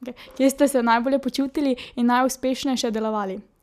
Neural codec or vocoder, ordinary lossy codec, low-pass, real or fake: none; none; 14.4 kHz; real